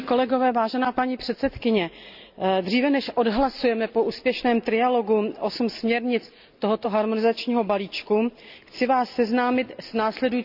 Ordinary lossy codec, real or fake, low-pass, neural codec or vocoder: none; real; 5.4 kHz; none